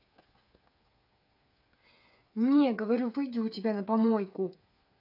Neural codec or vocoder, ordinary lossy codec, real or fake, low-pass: codec, 16 kHz, 8 kbps, FreqCodec, smaller model; none; fake; 5.4 kHz